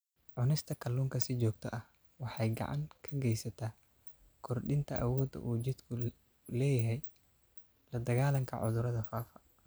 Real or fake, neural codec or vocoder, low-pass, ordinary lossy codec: real; none; none; none